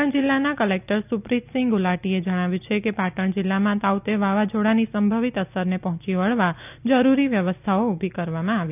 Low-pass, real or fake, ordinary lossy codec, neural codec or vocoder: 3.6 kHz; real; none; none